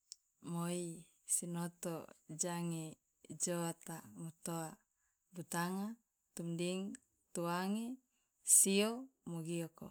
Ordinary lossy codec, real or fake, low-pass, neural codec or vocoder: none; real; none; none